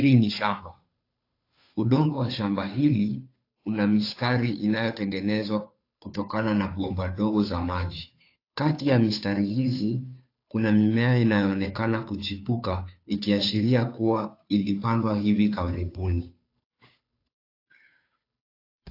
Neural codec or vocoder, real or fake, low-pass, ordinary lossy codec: codec, 16 kHz, 2 kbps, FunCodec, trained on Chinese and English, 25 frames a second; fake; 5.4 kHz; AAC, 32 kbps